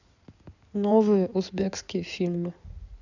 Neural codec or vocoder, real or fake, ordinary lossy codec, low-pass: codec, 16 kHz in and 24 kHz out, 2.2 kbps, FireRedTTS-2 codec; fake; none; 7.2 kHz